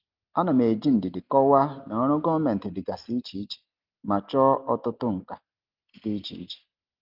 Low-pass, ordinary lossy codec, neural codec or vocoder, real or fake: 5.4 kHz; Opus, 24 kbps; none; real